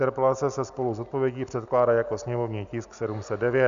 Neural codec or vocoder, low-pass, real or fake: none; 7.2 kHz; real